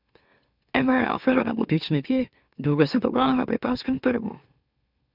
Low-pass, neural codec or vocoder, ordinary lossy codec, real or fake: 5.4 kHz; autoencoder, 44.1 kHz, a latent of 192 numbers a frame, MeloTTS; none; fake